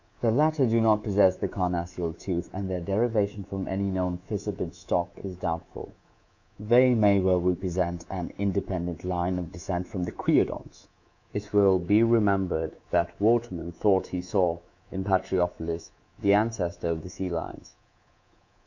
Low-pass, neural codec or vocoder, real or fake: 7.2 kHz; codec, 24 kHz, 3.1 kbps, DualCodec; fake